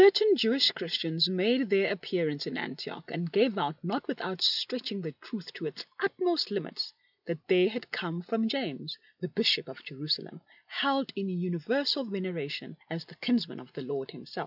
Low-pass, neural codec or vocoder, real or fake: 5.4 kHz; none; real